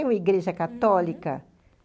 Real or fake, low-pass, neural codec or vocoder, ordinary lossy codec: real; none; none; none